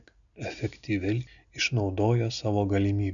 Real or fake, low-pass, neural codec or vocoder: real; 7.2 kHz; none